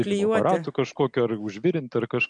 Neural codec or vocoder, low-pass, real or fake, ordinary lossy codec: none; 9.9 kHz; real; MP3, 64 kbps